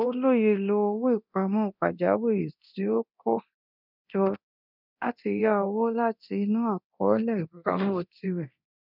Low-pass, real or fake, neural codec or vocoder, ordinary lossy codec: 5.4 kHz; fake; codec, 24 kHz, 0.9 kbps, DualCodec; none